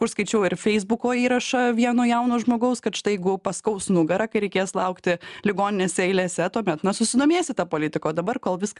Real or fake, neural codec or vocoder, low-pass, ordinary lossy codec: real; none; 10.8 kHz; MP3, 96 kbps